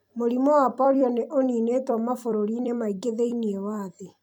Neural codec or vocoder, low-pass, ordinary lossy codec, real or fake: vocoder, 44.1 kHz, 128 mel bands every 256 samples, BigVGAN v2; 19.8 kHz; none; fake